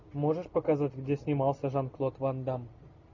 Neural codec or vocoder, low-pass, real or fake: none; 7.2 kHz; real